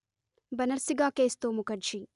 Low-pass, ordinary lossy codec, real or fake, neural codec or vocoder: 10.8 kHz; none; real; none